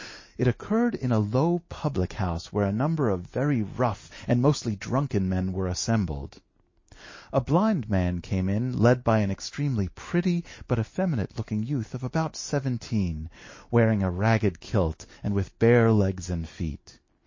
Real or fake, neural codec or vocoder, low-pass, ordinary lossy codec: real; none; 7.2 kHz; MP3, 32 kbps